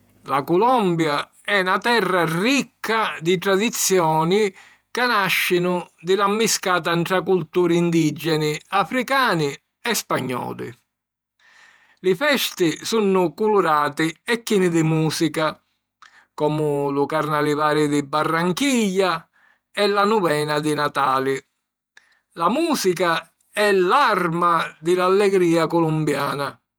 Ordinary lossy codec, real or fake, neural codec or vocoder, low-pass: none; fake; vocoder, 48 kHz, 128 mel bands, Vocos; none